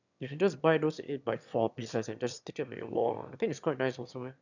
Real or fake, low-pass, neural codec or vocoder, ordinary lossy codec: fake; 7.2 kHz; autoencoder, 22.05 kHz, a latent of 192 numbers a frame, VITS, trained on one speaker; none